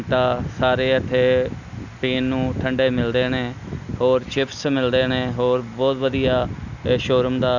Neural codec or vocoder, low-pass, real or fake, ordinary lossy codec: none; 7.2 kHz; real; none